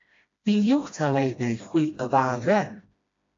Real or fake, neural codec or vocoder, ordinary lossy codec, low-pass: fake; codec, 16 kHz, 1 kbps, FreqCodec, smaller model; AAC, 48 kbps; 7.2 kHz